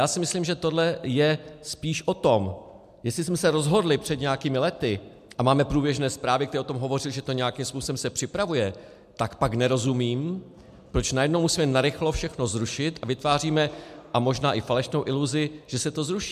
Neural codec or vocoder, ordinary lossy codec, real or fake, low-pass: none; MP3, 96 kbps; real; 14.4 kHz